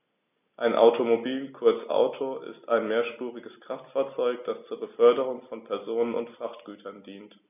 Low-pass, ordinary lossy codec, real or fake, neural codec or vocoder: 3.6 kHz; none; real; none